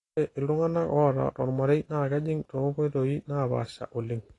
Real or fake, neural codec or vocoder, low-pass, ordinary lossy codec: real; none; 10.8 kHz; AAC, 32 kbps